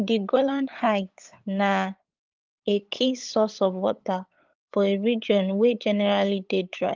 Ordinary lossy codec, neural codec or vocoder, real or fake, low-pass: Opus, 32 kbps; codec, 16 kHz, 8 kbps, FunCodec, trained on LibriTTS, 25 frames a second; fake; 7.2 kHz